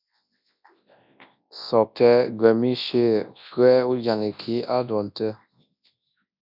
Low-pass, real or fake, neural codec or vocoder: 5.4 kHz; fake; codec, 24 kHz, 0.9 kbps, WavTokenizer, large speech release